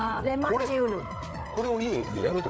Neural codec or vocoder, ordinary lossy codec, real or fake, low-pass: codec, 16 kHz, 8 kbps, FreqCodec, larger model; none; fake; none